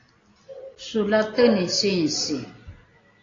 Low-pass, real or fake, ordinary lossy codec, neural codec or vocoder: 7.2 kHz; real; AAC, 32 kbps; none